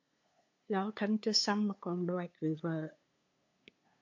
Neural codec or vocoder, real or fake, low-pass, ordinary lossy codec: codec, 16 kHz, 2 kbps, FunCodec, trained on LibriTTS, 25 frames a second; fake; 7.2 kHz; MP3, 48 kbps